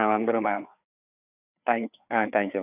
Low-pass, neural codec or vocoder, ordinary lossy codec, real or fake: 3.6 kHz; codec, 16 kHz, 4 kbps, FreqCodec, larger model; none; fake